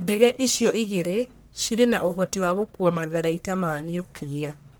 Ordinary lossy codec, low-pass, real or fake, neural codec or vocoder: none; none; fake; codec, 44.1 kHz, 1.7 kbps, Pupu-Codec